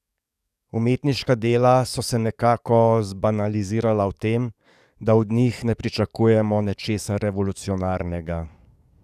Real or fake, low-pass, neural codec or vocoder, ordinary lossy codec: fake; 14.4 kHz; codec, 44.1 kHz, 7.8 kbps, DAC; none